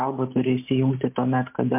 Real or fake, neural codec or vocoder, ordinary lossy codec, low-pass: real; none; MP3, 32 kbps; 3.6 kHz